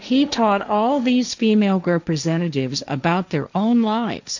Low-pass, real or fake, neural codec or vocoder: 7.2 kHz; fake; codec, 16 kHz, 1.1 kbps, Voila-Tokenizer